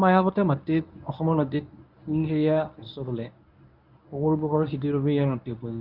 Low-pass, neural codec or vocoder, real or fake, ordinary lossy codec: 5.4 kHz; codec, 24 kHz, 0.9 kbps, WavTokenizer, medium speech release version 1; fake; none